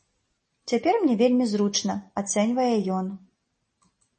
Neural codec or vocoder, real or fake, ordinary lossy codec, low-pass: none; real; MP3, 32 kbps; 10.8 kHz